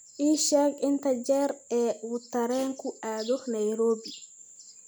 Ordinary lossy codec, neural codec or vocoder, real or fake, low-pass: none; none; real; none